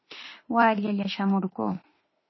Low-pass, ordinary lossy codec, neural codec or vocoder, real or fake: 7.2 kHz; MP3, 24 kbps; autoencoder, 48 kHz, 32 numbers a frame, DAC-VAE, trained on Japanese speech; fake